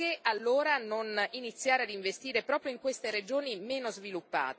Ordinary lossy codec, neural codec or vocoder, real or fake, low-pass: none; none; real; none